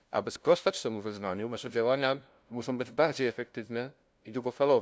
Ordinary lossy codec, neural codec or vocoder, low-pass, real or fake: none; codec, 16 kHz, 0.5 kbps, FunCodec, trained on LibriTTS, 25 frames a second; none; fake